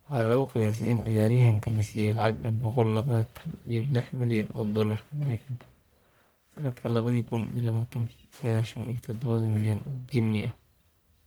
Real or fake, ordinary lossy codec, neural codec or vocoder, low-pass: fake; none; codec, 44.1 kHz, 1.7 kbps, Pupu-Codec; none